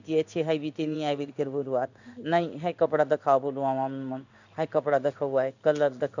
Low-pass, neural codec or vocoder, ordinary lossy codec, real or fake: 7.2 kHz; codec, 16 kHz in and 24 kHz out, 1 kbps, XY-Tokenizer; none; fake